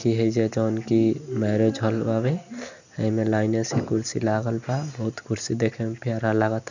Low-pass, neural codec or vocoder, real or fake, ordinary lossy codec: 7.2 kHz; none; real; none